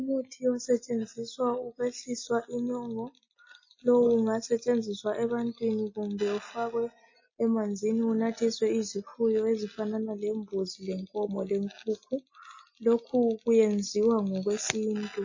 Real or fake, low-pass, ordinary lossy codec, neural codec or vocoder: real; 7.2 kHz; MP3, 32 kbps; none